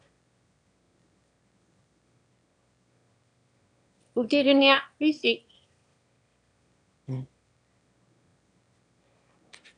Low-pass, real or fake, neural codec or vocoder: 9.9 kHz; fake; autoencoder, 22.05 kHz, a latent of 192 numbers a frame, VITS, trained on one speaker